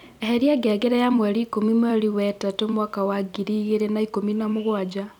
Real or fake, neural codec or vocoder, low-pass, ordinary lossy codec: fake; vocoder, 44.1 kHz, 128 mel bands every 256 samples, BigVGAN v2; 19.8 kHz; none